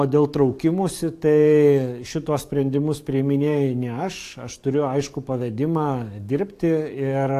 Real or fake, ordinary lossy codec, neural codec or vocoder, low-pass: fake; AAC, 64 kbps; codec, 44.1 kHz, 7.8 kbps, DAC; 14.4 kHz